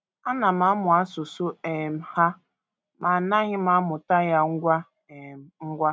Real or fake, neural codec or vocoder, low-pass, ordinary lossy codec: real; none; none; none